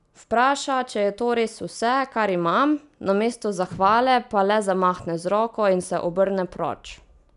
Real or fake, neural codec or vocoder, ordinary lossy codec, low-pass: real; none; none; 10.8 kHz